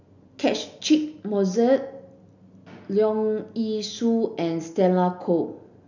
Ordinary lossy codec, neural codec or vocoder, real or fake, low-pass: none; none; real; 7.2 kHz